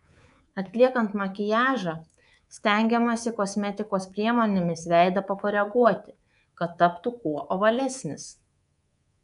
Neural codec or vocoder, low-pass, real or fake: codec, 24 kHz, 3.1 kbps, DualCodec; 10.8 kHz; fake